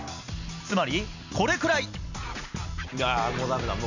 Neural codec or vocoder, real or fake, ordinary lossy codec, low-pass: none; real; none; 7.2 kHz